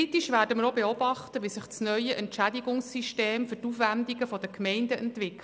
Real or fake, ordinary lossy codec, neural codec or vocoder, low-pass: real; none; none; none